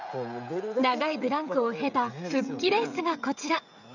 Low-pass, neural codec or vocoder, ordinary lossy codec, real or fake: 7.2 kHz; codec, 16 kHz, 16 kbps, FreqCodec, smaller model; none; fake